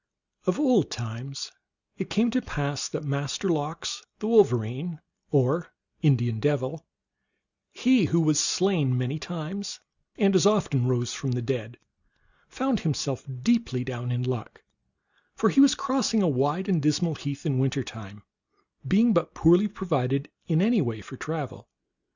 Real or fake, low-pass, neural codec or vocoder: real; 7.2 kHz; none